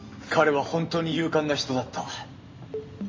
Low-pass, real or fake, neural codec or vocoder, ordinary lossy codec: 7.2 kHz; real; none; MP3, 32 kbps